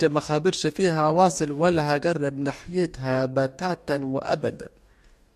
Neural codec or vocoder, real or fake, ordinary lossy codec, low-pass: codec, 44.1 kHz, 2.6 kbps, DAC; fake; MP3, 64 kbps; 14.4 kHz